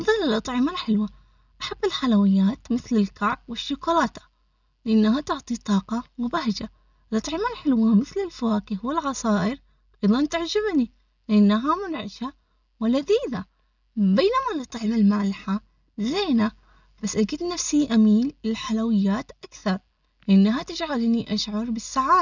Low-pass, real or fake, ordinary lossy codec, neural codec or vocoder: 7.2 kHz; real; none; none